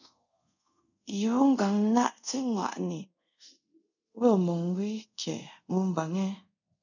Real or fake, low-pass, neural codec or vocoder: fake; 7.2 kHz; codec, 24 kHz, 0.5 kbps, DualCodec